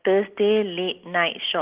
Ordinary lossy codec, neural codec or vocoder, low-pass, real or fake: Opus, 16 kbps; none; 3.6 kHz; real